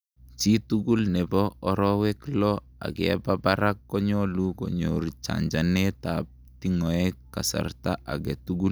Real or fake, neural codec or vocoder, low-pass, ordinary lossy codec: real; none; none; none